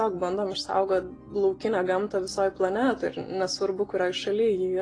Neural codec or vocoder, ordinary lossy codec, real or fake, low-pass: none; AAC, 32 kbps; real; 9.9 kHz